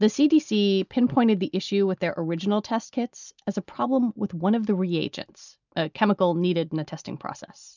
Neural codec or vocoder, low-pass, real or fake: none; 7.2 kHz; real